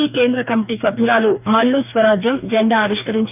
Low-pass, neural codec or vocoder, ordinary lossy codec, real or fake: 3.6 kHz; codec, 44.1 kHz, 2.6 kbps, DAC; none; fake